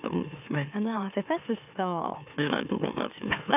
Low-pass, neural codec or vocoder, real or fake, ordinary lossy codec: 3.6 kHz; autoencoder, 44.1 kHz, a latent of 192 numbers a frame, MeloTTS; fake; none